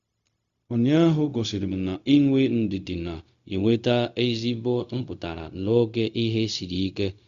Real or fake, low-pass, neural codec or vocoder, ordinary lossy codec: fake; 7.2 kHz; codec, 16 kHz, 0.4 kbps, LongCat-Audio-Codec; Opus, 64 kbps